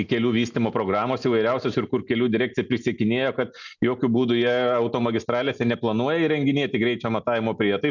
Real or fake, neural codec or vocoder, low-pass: real; none; 7.2 kHz